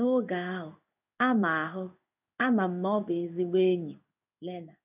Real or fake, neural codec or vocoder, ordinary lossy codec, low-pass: fake; codec, 16 kHz in and 24 kHz out, 1 kbps, XY-Tokenizer; none; 3.6 kHz